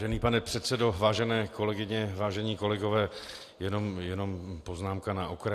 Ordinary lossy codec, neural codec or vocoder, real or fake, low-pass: AAC, 64 kbps; none; real; 14.4 kHz